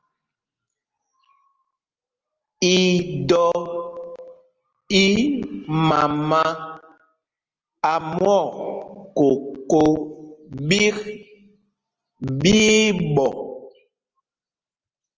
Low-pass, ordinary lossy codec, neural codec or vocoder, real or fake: 7.2 kHz; Opus, 24 kbps; none; real